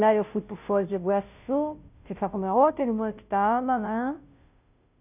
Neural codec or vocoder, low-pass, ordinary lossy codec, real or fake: codec, 16 kHz, 0.5 kbps, FunCodec, trained on Chinese and English, 25 frames a second; 3.6 kHz; none; fake